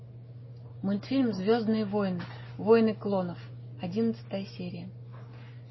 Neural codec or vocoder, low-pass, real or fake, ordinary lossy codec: none; 7.2 kHz; real; MP3, 24 kbps